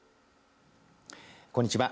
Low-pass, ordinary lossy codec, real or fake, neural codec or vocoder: none; none; real; none